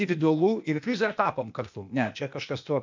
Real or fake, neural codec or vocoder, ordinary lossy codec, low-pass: fake; codec, 16 kHz, 0.8 kbps, ZipCodec; MP3, 64 kbps; 7.2 kHz